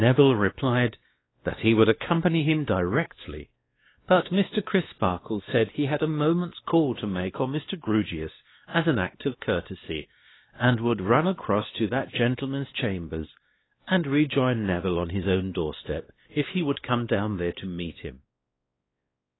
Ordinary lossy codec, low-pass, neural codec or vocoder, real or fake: AAC, 16 kbps; 7.2 kHz; codec, 16 kHz, 2 kbps, X-Codec, WavLM features, trained on Multilingual LibriSpeech; fake